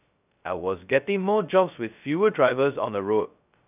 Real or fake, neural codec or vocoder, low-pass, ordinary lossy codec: fake; codec, 16 kHz, 0.2 kbps, FocalCodec; 3.6 kHz; none